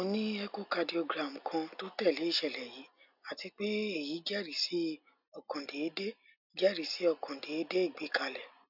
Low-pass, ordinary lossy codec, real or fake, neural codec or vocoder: 5.4 kHz; none; real; none